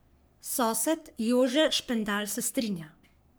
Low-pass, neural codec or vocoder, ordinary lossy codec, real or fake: none; codec, 44.1 kHz, 3.4 kbps, Pupu-Codec; none; fake